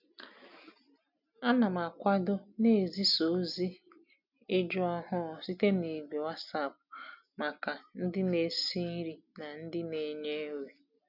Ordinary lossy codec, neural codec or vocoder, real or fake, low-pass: none; none; real; 5.4 kHz